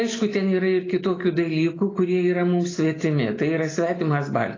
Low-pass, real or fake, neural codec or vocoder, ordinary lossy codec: 7.2 kHz; real; none; AAC, 32 kbps